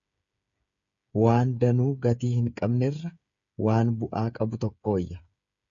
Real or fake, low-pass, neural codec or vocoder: fake; 7.2 kHz; codec, 16 kHz, 8 kbps, FreqCodec, smaller model